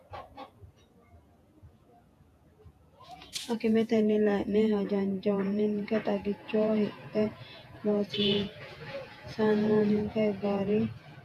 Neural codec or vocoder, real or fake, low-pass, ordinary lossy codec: vocoder, 48 kHz, 128 mel bands, Vocos; fake; 14.4 kHz; AAC, 64 kbps